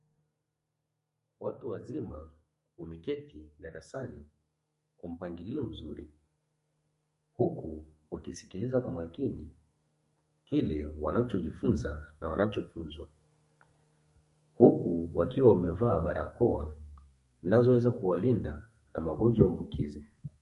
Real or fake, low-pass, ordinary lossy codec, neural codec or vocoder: fake; 14.4 kHz; MP3, 48 kbps; codec, 32 kHz, 1.9 kbps, SNAC